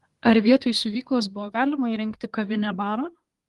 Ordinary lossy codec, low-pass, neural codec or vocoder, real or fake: Opus, 24 kbps; 10.8 kHz; codec, 24 kHz, 1 kbps, SNAC; fake